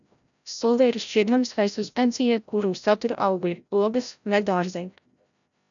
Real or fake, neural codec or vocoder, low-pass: fake; codec, 16 kHz, 0.5 kbps, FreqCodec, larger model; 7.2 kHz